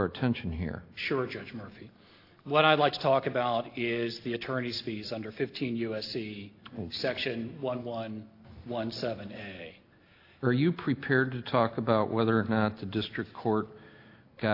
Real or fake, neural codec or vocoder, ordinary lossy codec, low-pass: real; none; AAC, 32 kbps; 5.4 kHz